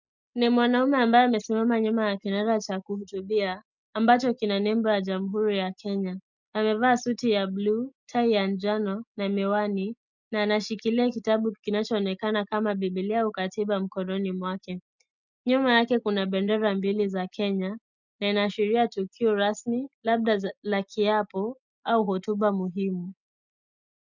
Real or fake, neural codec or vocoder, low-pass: real; none; 7.2 kHz